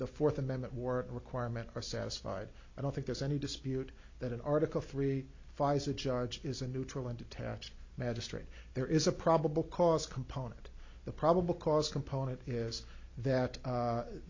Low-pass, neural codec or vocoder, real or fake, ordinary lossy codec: 7.2 kHz; none; real; MP3, 64 kbps